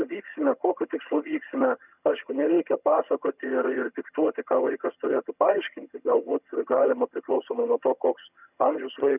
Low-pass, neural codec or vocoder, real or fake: 3.6 kHz; vocoder, 22.05 kHz, 80 mel bands, HiFi-GAN; fake